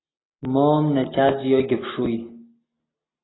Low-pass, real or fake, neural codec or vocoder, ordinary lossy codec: 7.2 kHz; real; none; AAC, 16 kbps